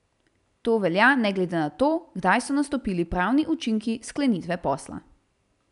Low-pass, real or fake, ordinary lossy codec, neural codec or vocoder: 10.8 kHz; real; none; none